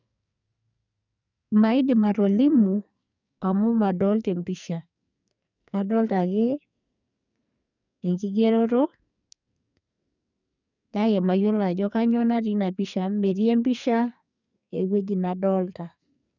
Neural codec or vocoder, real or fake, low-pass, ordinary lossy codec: codec, 44.1 kHz, 2.6 kbps, SNAC; fake; 7.2 kHz; none